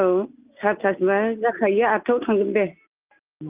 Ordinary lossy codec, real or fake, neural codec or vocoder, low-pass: Opus, 24 kbps; real; none; 3.6 kHz